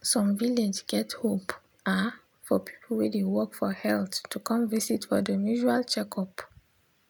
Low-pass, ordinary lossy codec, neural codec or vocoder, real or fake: none; none; none; real